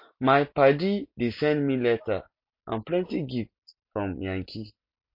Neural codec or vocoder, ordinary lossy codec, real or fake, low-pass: none; MP3, 32 kbps; real; 5.4 kHz